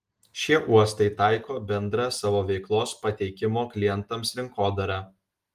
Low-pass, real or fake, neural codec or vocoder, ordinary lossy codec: 14.4 kHz; real; none; Opus, 24 kbps